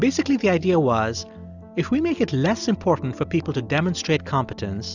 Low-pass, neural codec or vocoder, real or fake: 7.2 kHz; none; real